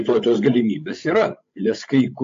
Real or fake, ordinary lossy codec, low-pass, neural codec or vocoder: fake; AAC, 48 kbps; 7.2 kHz; codec, 16 kHz, 16 kbps, FreqCodec, larger model